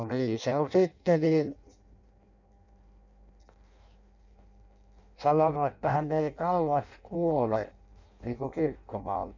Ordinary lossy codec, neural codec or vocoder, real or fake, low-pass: none; codec, 16 kHz in and 24 kHz out, 0.6 kbps, FireRedTTS-2 codec; fake; 7.2 kHz